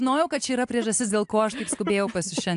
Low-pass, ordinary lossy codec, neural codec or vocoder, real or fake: 10.8 kHz; AAC, 64 kbps; none; real